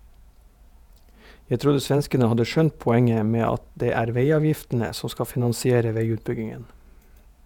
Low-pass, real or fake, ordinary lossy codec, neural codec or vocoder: 19.8 kHz; fake; none; vocoder, 48 kHz, 128 mel bands, Vocos